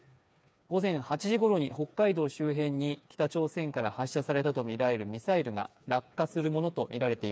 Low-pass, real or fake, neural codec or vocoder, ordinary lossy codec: none; fake; codec, 16 kHz, 4 kbps, FreqCodec, smaller model; none